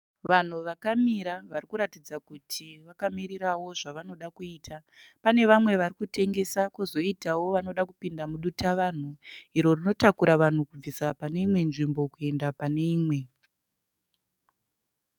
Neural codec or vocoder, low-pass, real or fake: codec, 44.1 kHz, 7.8 kbps, DAC; 19.8 kHz; fake